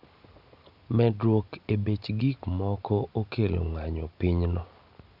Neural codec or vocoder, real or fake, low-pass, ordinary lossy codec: none; real; 5.4 kHz; none